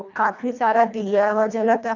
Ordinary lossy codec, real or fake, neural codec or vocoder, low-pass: none; fake; codec, 24 kHz, 1.5 kbps, HILCodec; 7.2 kHz